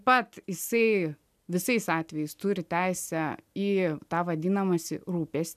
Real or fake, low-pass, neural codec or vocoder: real; 14.4 kHz; none